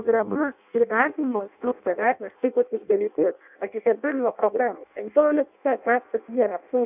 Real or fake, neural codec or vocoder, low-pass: fake; codec, 16 kHz in and 24 kHz out, 0.6 kbps, FireRedTTS-2 codec; 3.6 kHz